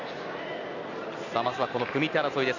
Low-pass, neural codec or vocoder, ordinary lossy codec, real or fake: 7.2 kHz; none; none; real